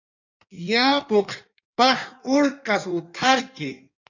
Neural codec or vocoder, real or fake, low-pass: codec, 16 kHz in and 24 kHz out, 1.1 kbps, FireRedTTS-2 codec; fake; 7.2 kHz